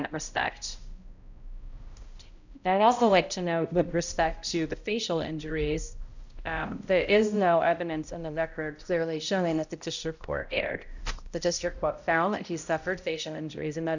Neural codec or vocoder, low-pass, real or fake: codec, 16 kHz, 0.5 kbps, X-Codec, HuBERT features, trained on balanced general audio; 7.2 kHz; fake